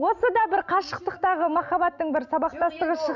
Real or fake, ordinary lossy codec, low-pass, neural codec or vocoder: real; none; 7.2 kHz; none